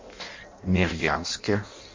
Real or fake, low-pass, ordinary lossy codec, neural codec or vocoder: fake; 7.2 kHz; MP3, 64 kbps; codec, 16 kHz in and 24 kHz out, 0.6 kbps, FireRedTTS-2 codec